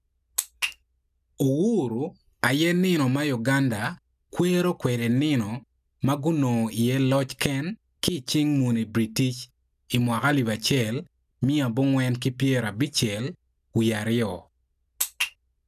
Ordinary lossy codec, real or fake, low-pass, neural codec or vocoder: none; real; 14.4 kHz; none